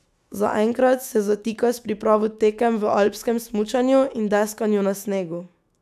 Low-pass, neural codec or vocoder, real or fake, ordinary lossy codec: 14.4 kHz; autoencoder, 48 kHz, 128 numbers a frame, DAC-VAE, trained on Japanese speech; fake; none